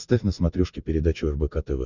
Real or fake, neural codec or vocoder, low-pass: real; none; 7.2 kHz